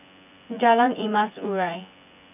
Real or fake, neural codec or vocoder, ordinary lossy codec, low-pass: fake; vocoder, 24 kHz, 100 mel bands, Vocos; none; 3.6 kHz